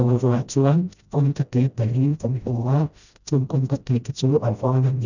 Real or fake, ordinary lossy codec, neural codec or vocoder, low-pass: fake; none; codec, 16 kHz, 0.5 kbps, FreqCodec, smaller model; 7.2 kHz